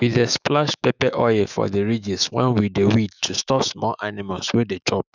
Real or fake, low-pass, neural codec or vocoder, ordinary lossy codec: fake; 7.2 kHz; autoencoder, 48 kHz, 128 numbers a frame, DAC-VAE, trained on Japanese speech; none